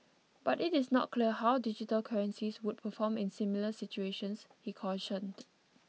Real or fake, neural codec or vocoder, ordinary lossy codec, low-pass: real; none; none; none